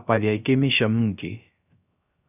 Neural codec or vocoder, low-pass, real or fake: codec, 16 kHz, 0.3 kbps, FocalCodec; 3.6 kHz; fake